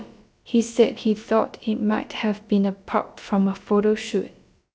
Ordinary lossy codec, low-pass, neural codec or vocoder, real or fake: none; none; codec, 16 kHz, about 1 kbps, DyCAST, with the encoder's durations; fake